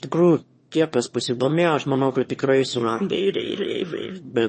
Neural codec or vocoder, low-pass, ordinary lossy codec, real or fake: autoencoder, 22.05 kHz, a latent of 192 numbers a frame, VITS, trained on one speaker; 9.9 kHz; MP3, 32 kbps; fake